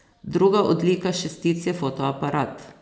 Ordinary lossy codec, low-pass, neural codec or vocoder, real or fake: none; none; none; real